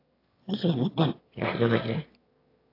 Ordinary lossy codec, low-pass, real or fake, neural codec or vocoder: AAC, 32 kbps; 5.4 kHz; fake; autoencoder, 22.05 kHz, a latent of 192 numbers a frame, VITS, trained on one speaker